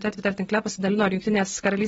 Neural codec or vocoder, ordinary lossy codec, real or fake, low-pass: none; AAC, 24 kbps; real; 19.8 kHz